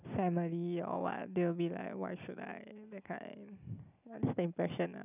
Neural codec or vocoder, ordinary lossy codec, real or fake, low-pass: none; none; real; 3.6 kHz